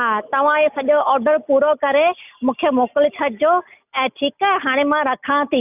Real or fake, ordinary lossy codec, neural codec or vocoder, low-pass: real; none; none; 3.6 kHz